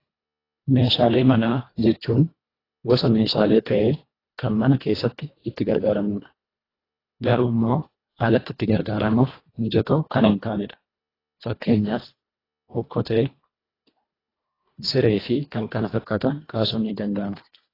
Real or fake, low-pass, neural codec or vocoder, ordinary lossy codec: fake; 5.4 kHz; codec, 24 kHz, 1.5 kbps, HILCodec; AAC, 24 kbps